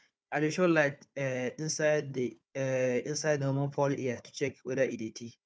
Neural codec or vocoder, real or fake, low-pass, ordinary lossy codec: codec, 16 kHz, 4 kbps, FunCodec, trained on Chinese and English, 50 frames a second; fake; none; none